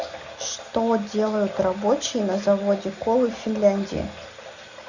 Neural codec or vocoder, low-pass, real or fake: none; 7.2 kHz; real